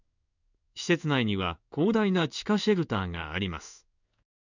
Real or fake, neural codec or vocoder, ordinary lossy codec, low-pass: fake; codec, 16 kHz in and 24 kHz out, 1 kbps, XY-Tokenizer; none; 7.2 kHz